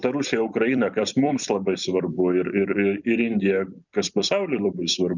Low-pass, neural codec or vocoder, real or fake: 7.2 kHz; vocoder, 44.1 kHz, 128 mel bands every 256 samples, BigVGAN v2; fake